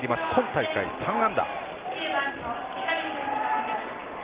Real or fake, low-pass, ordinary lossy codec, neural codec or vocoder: real; 3.6 kHz; Opus, 16 kbps; none